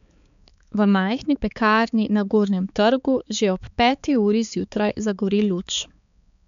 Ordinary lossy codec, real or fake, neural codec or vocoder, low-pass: none; fake; codec, 16 kHz, 4 kbps, X-Codec, HuBERT features, trained on balanced general audio; 7.2 kHz